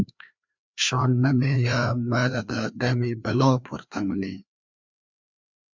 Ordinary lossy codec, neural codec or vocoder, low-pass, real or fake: MP3, 64 kbps; codec, 16 kHz, 2 kbps, FreqCodec, larger model; 7.2 kHz; fake